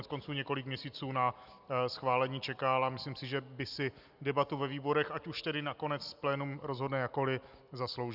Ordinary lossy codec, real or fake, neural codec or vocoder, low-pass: Opus, 64 kbps; real; none; 5.4 kHz